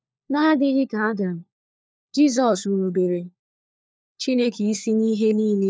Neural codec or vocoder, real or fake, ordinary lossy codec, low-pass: codec, 16 kHz, 4 kbps, FunCodec, trained on LibriTTS, 50 frames a second; fake; none; none